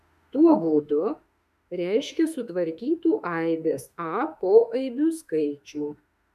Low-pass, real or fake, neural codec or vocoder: 14.4 kHz; fake; autoencoder, 48 kHz, 32 numbers a frame, DAC-VAE, trained on Japanese speech